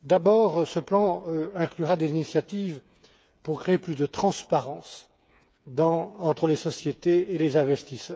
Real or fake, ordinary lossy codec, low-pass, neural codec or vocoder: fake; none; none; codec, 16 kHz, 8 kbps, FreqCodec, smaller model